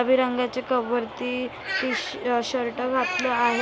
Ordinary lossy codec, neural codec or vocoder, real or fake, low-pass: none; none; real; none